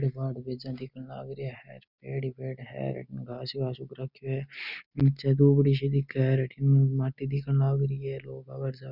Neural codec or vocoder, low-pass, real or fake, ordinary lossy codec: none; 5.4 kHz; real; none